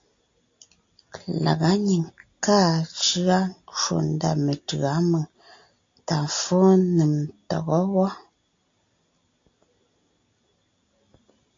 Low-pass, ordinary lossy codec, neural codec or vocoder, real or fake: 7.2 kHz; AAC, 48 kbps; none; real